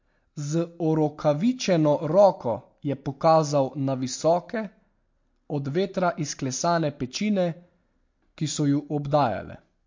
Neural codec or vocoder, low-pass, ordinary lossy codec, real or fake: none; 7.2 kHz; MP3, 48 kbps; real